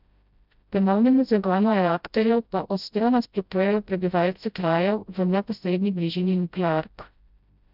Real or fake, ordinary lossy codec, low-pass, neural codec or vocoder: fake; none; 5.4 kHz; codec, 16 kHz, 0.5 kbps, FreqCodec, smaller model